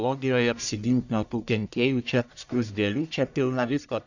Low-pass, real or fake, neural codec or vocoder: 7.2 kHz; fake; codec, 44.1 kHz, 1.7 kbps, Pupu-Codec